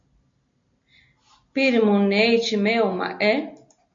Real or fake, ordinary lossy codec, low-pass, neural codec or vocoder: real; MP3, 48 kbps; 7.2 kHz; none